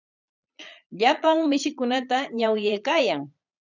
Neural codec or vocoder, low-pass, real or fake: vocoder, 22.05 kHz, 80 mel bands, Vocos; 7.2 kHz; fake